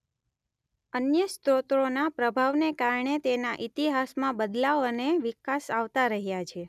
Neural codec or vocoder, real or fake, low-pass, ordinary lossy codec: none; real; 14.4 kHz; none